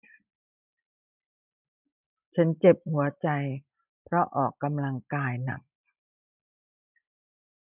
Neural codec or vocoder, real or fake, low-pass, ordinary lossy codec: vocoder, 44.1 kHz, 128 mel bands every 256 samples, BigVGAN v2; fake; 3.6 kHz; none